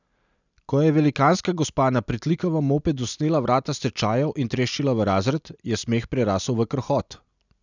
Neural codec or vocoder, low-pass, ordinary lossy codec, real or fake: none; 7.2 kHz; none; real